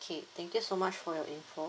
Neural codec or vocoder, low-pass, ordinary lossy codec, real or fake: none; none; none; real